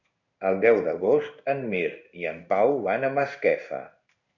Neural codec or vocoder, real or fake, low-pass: codec, 16 kHz in and 24 kHz out, 1 kbps, XY-Tokenizer; fake; 7.2 kHz